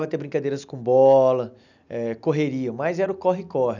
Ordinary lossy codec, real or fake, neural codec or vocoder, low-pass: none; real; none; 7.2 kHz